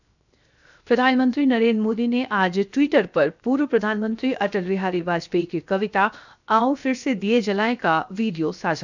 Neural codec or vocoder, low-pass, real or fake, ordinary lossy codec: codec, 16 kHz, 0.7 kbps, FocalCodec; 7.2 kHz; fake; none